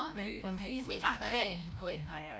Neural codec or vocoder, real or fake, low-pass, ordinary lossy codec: codec, 16 kHz, 0.5 kbps, FreqCodec, larger model; fake; none; none